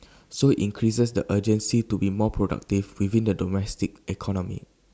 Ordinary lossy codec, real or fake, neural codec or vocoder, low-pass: none; real; none; none